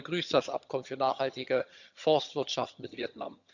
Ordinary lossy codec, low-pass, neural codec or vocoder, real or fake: none; 7.2 kHz; vocoder, 22.05 kHz, 80 mel bands, HiFi-GAN; fake